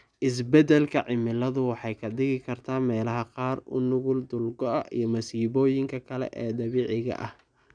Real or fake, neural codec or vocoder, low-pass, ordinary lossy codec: real; none; 9.9 kHz; none